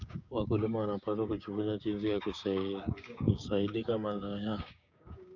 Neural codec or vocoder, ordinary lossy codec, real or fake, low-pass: codec, 16 kHz, 6 kbps, DAC; none; fake; 7.2 kHz